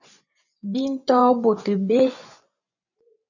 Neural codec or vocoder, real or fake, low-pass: vocoder, 24 kHz, 100 mel bands, Vocos; fake; 7.2 kHz